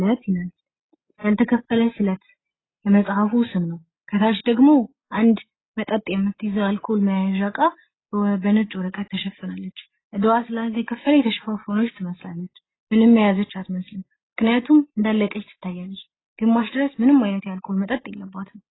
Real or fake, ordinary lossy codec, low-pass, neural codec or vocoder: real; AAC, 16 kbps; 7.2 kHz; none